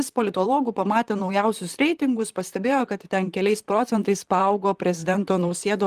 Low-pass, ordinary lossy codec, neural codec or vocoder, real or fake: 14.4 kHz; Opus, 24 kbps; vocoder, 44.1 kHz, 128 mel bands, Pupu-Vocoder; fake